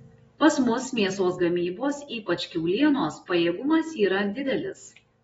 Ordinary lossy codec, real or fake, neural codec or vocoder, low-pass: AAC, 24 kbps; real; none; 10.8 kHz